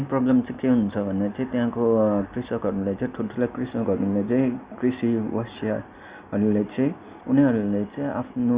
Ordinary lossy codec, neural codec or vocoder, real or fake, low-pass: Opus, 64 kbps; none; real; 3.6 kHz